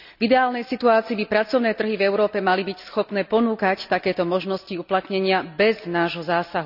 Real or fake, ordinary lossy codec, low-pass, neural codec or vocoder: real; none; 5.4 kHz; none